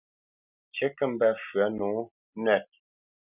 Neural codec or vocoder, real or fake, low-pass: none; real; 3.6 kHz